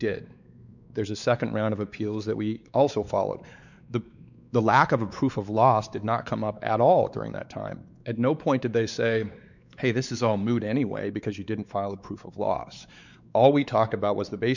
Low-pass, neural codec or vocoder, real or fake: 7.2 kHz; codec, 16 kHz, 4 kbps, X-Codec, WavLM features, trained on Multilingual LibriSpeech; fake